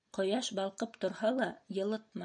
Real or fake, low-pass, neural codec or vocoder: real; 9.9 kHz; none